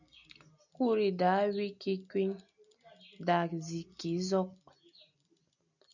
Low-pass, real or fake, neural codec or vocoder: 7.2 kHz; real; none